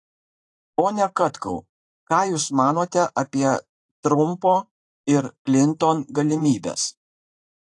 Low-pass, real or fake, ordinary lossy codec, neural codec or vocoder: 10.8 kHz; fake; AAC, 48 kbps; vocoder, 44.1 kHz, 128 mel bands every 512 samples, BigVGAN v2